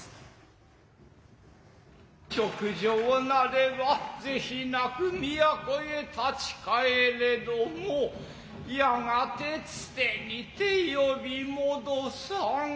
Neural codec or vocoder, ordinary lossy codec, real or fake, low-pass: none; none; real; none